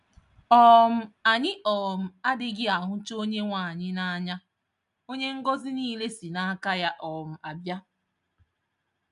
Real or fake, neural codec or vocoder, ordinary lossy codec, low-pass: real; none; none; 10.8 kHz